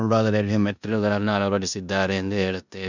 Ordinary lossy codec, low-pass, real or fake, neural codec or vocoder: none; 7.2 kHz; fake; codec, 16 kHz in and 24 kHz out, 0.9 kbps, LongCat-Audio-Codec, four codebook decoder